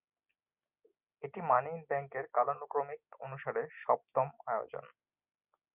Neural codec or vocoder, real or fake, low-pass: none; real; 3.6 kHz